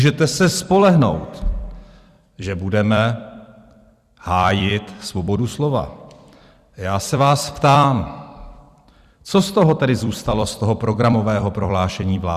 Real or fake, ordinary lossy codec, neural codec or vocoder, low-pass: fake; Opus, 64 kbps; vocoder, 44.1 kHz, 128 mel bands every 256 samples, BigVGAN v2; 14.4 kHz